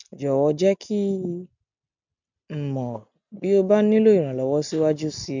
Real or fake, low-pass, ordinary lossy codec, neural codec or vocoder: real; 7.2 kHz; none; none